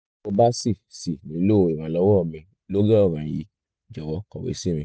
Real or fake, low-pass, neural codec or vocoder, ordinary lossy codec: real; none; none; none